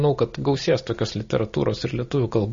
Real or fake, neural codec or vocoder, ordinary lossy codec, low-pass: real; none; MP3, 32 kbps; 7.2 kHz